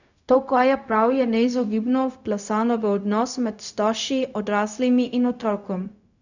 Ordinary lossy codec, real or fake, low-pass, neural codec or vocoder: none; fake; 7.2 kHz; codec, 16 kHz, 0.4 kbps, LongCat-Audio-Codec